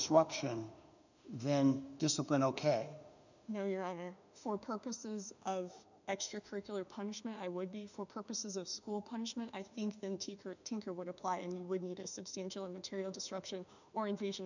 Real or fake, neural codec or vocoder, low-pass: fake; autoencoder, 48 kHz, 32 numbers a frame, DAC-VAE, trained on Japanese speech; 7.2 kHz